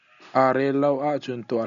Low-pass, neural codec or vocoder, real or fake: 7.2 kHz; none; real